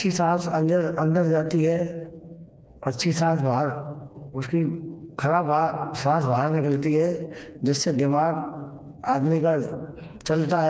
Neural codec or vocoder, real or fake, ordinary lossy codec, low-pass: codec, 16 kHz, 2 kbps, FreqCodec, smaller model; fake; none; none